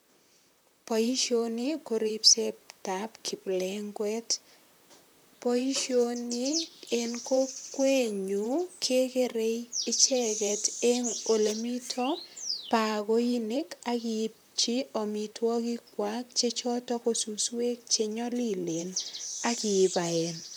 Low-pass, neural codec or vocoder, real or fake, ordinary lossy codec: none; vocoder, 44.1 kHz, 128 mel bands, Pupu-Vocoder; fake; none